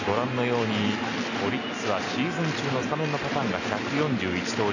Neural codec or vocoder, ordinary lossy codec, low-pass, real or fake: none; none; 7.2 kHz; real